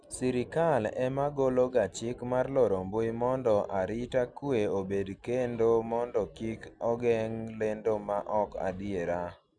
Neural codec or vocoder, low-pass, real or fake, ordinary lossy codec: none; 9.9 kHz; real; none